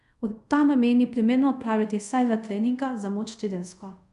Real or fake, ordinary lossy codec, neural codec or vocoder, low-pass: fake; none; codec, 24 kHz, 0.5 kbps, DualCodec; 10.8 kHz